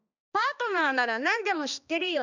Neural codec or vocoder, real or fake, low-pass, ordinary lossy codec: codec, 16 kHz, 2 kbps, X-Codec, HuBERT features, trained on balanced general audio; fake; 7.2 kHz; none